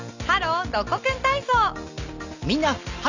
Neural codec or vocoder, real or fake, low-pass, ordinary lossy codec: none; real; 7.2 kHz; none